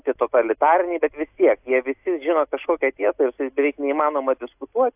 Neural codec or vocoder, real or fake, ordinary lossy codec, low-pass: none; real; AAC, 32 kbps; 3.6 kHz